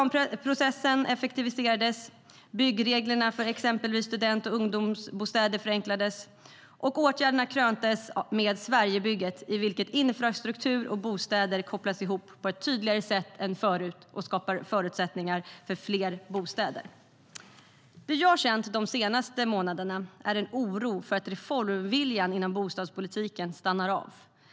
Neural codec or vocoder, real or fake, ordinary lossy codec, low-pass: none; real; none; none